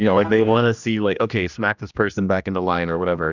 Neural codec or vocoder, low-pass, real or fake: codec, 16 kHz, 1 kbps, X-Codec, HuBERT features, trained on general audio; 7.2 kHz; fake